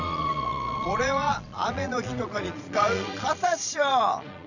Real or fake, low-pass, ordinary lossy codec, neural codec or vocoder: fake; 7.2 kHz; none; vocoder, 22.05 kHz, 80 mel bands, Vocos